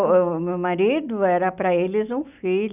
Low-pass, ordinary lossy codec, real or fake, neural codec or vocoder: 3.6 kHz; none; real; none